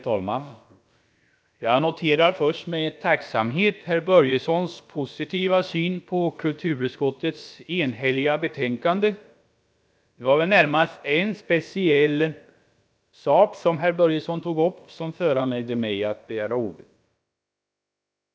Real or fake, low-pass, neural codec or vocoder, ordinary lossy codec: fake; none; codec, 16 kHz, about 1 kbps, DyCAST, with the encoder's durations; none